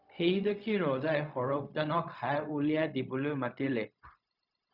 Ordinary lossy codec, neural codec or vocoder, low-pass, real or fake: Opus, 64 kbps; codec, 16 kHz, 0.4 kbps, LongCat-Audio-Codec; 5.4 kHz; fake